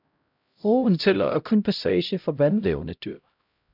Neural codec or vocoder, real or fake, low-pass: codec, 16 kHz, 0.5 kbps, X-Codec, HuBERT features, trained on LibriSpeech; fake; 5.4 kHz